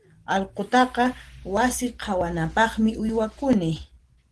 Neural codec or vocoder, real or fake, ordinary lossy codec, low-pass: none; real; Opus, 16 kbps; 10.8 kHz